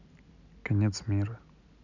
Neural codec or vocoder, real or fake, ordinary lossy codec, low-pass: none; real; none; 7.2 kHz